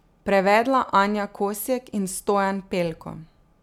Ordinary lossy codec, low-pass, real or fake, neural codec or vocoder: none; 19.8 kHz; real; none